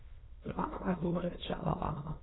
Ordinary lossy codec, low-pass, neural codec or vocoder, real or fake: AAC, 16 kbps; 7.2 kHz; autoencoder, 22.05 kHz, a latent of 192 numbers a frame, VITS, trained on many speakers; fake